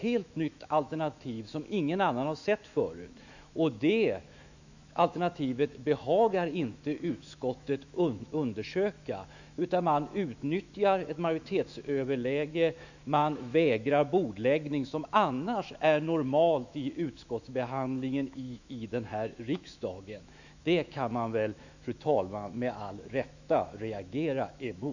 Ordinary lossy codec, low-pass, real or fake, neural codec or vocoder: none; 7.2 kHz; fake; autoencoder, 48 kHz, 128 numbers a frame, DAC-VAE, trained on Japanese speech